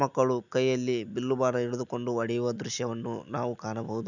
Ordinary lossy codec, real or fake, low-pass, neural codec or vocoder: none; real; 7.2 kHz; none